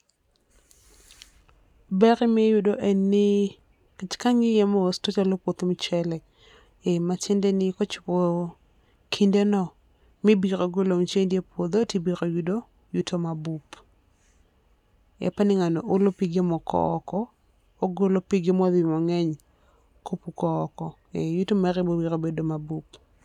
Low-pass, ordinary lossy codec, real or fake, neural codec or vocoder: 19.8 kHz; none; real; none